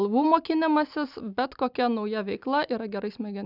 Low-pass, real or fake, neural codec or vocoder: 5.4 kHz; real; none